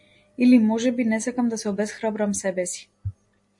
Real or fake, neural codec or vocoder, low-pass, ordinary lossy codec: real; none; 10.8 kHz; MP3, 48 kbps